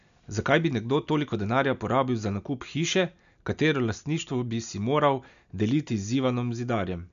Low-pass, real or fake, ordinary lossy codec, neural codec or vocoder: 7.2 kHz; real; none; none